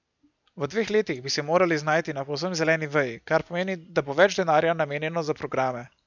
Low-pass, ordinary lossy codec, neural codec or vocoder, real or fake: 7.2 kHz; none; none; real